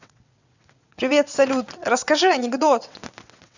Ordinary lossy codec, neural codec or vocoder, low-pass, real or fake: none; vocoder, 22.05 kHz, 80 mel bands, WaveNeXt; 7.2 kHz; fake